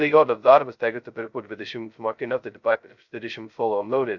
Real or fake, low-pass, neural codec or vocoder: fake; 7.2 kHz; codec, 16 kHz, 0.2 kbps, FocalCodec